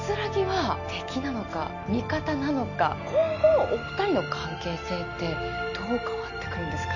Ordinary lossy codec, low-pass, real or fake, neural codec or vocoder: none; 7.2 kHz; real; none